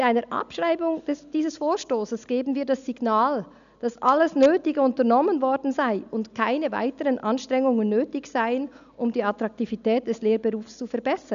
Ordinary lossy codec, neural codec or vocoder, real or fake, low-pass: none; none; real; 7.2 kHz